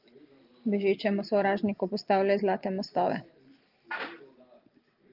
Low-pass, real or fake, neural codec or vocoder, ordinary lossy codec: 5.4 kHz; real; none; Opus, 32 kbps